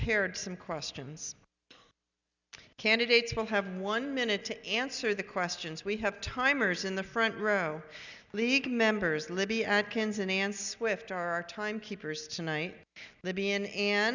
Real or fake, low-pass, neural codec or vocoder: real; 7.2 kHz; none